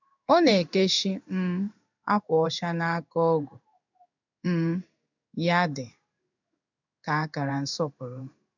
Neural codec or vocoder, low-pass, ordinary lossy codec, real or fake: codec, 16 kHz in and 24 kHz out, 1 kbps, XY-Tokenizer; 7.2 kHz; none; fake